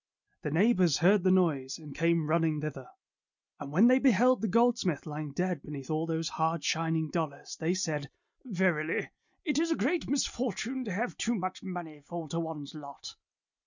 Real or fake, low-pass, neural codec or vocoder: real; 7.2 kHz; none